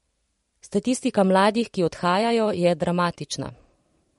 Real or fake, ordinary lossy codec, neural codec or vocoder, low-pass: fake; MP3, 48 kbps; vocoder, 44.1 kHz, 128 mel bands every 512 samples, BigVGAN v2; 19.8 kHz